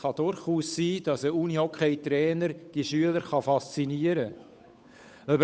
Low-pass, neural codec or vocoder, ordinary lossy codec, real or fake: none; codec, 16 kHz, 8 kbps, FunCodec, trained on Chinese and English, 25 frames a second; none; fake